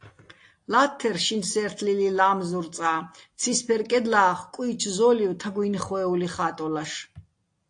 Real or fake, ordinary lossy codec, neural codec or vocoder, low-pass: real; AAC, 48 kbps; none; 9.9 kHz